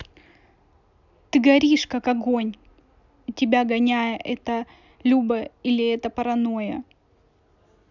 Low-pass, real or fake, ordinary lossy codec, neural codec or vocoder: 7.2 kHz; real; none; none